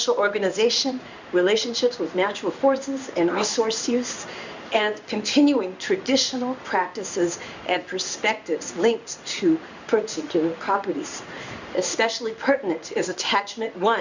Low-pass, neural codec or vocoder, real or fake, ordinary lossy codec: 7.2 kHz; codec, 16 kHz in and 24 kHz out, 1 kbps, XY-Tokenizer; fake; Opus, 64 kbps